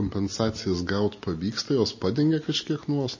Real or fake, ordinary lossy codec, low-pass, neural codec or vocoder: real; MP3, 32 kbps; 7.2 kHz; none